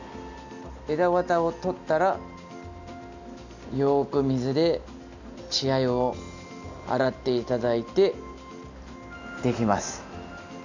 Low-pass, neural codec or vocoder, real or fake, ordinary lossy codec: 7.2 kHz; none; real; none